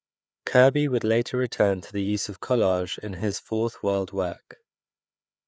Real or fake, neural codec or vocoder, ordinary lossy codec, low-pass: fake; codec, 16 kHz, 4 kbps, FreqCodec, larger model; none; none